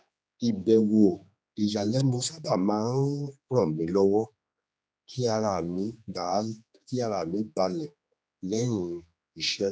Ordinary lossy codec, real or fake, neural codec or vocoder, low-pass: none; fake; codec, 16 kHz, 2 kbps, X-Codec, HuBERT features, trained on general audio; none